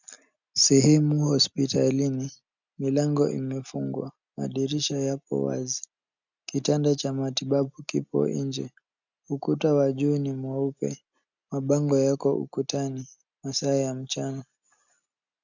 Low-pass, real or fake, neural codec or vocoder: 7.2 kHz; real; none